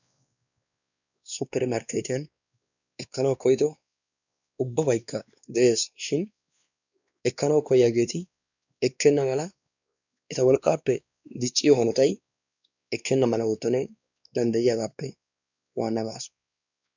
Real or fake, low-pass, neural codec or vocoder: fake; 7.2 kHz; codec, 16 kHz, 2 kbps, X-Codec, WavLM features, trained on Multilingual LibriSpeech